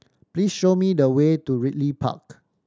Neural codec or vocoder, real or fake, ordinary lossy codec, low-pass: none; real; none; none